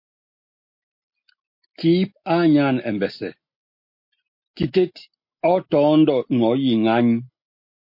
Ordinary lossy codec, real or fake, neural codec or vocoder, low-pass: MP3, 32 kbps; real; none; 5.4 kHz